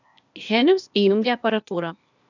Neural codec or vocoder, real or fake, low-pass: codec, 16 kHz, 0.8 kbps, ZipCodec; fake; 7.2 kHz